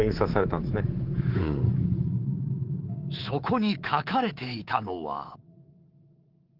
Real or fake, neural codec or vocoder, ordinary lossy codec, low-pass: fake; codec, 24 kHz, 3.1 kbps, DualCodec; Opus, 16 kbps; 5.4 kHz